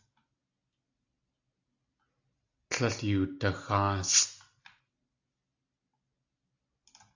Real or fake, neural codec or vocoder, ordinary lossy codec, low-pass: real; none; AAC, 48 kbps; 7.2 kHz